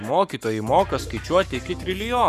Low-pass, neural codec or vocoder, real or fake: 14.4 kHz; codec, 44.1 kHz, 7.8 kbps, Pupu-Codec; fake